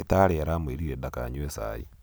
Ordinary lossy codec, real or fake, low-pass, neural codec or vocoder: none; real; none; none